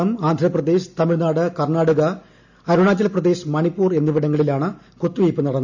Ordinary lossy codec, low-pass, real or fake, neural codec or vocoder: none; 7.2 kHz; real; none